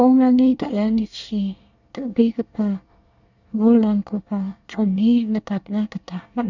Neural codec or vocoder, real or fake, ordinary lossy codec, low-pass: codec, 24 kHz, 1 kbps, SNAC; fake; none; 7.2 kHz